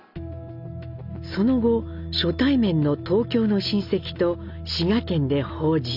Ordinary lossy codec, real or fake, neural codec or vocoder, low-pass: none; real; none; 5.4 kHz